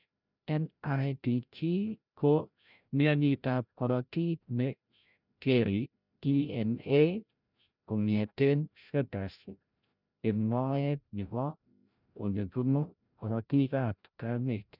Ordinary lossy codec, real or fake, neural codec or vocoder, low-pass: none; fake; codec, 16 kHz, 0.5 kbps, FreqCodec, larger model; 5.4 kHz